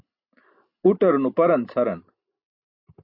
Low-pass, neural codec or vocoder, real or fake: 5.4 kHz; none; real